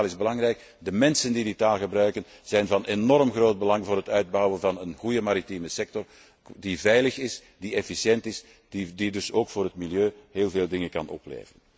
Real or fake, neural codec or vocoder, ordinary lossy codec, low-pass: real; none; none; none